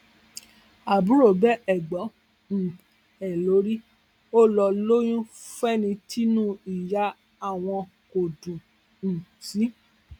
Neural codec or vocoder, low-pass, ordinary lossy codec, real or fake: none; 19.8 kHz; none; real